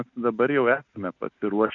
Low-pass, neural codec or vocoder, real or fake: 7.2 kHz; none; real